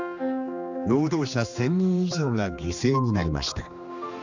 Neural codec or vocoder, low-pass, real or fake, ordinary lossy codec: codec, 16 kHz, 2 kbps, X-Codec, HuBERT features, trained on general audio; 7.2 kHz; fake; none